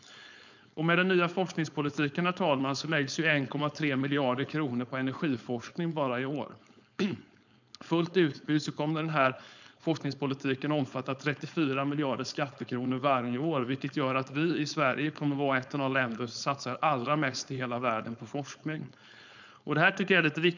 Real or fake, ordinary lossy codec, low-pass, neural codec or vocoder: fake; none; 7.2 kHz; codec, 16 kHz, 4.8 kbps, FACodec